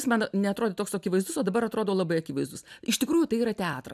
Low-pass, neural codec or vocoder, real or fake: 14.4 kHz; none; real